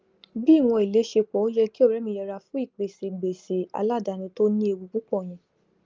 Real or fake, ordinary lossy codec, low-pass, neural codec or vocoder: real; Opus, 24 kbps; 7.2 kHz; none